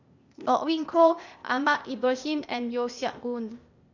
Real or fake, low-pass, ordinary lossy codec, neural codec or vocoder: fake; 7.2 kHz; none; codec, 16 kHz, 0.8 kbps, ZipCodec